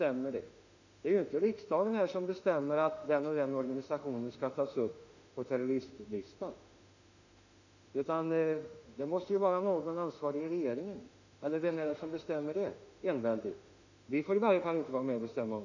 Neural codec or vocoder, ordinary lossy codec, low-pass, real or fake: autoencoder, 48 kHz, 32 numbers a frame, DAC-VAE, trained on Japanese speech; none; 7.2 kHz; fake